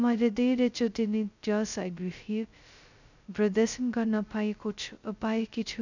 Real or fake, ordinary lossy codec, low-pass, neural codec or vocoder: fake; none; 7.2 kHz; codec, 16 kHz, 0.2 kbps, FocalCodec